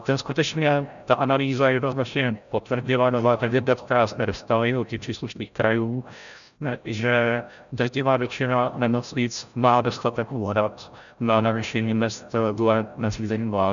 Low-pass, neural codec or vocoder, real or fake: 7.2 kHz; codec, 16 kHz, 0.5 kbps, FreqCodec, larger model; fake